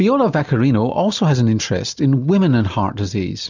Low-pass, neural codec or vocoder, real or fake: 7.2 kHz; none; real